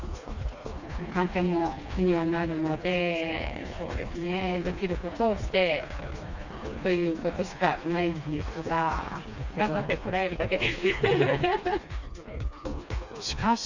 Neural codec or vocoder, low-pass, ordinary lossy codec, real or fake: codec, 16 kHz, 2 kbps, FreqCodec, smaller model; 7.2 kHz; none; fake